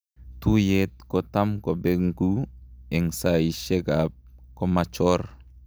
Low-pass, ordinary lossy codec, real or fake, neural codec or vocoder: none; none; real; none